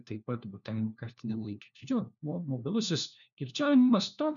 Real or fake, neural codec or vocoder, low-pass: fake; codec, 16 kHz, 1 kbps, FunCodec, trained on LibriTTS, 50 frames a second; 7.2 kHz